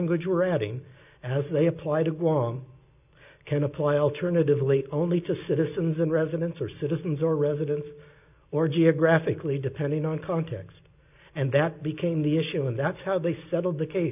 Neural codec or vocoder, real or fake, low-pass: none; real; 3.6 kHz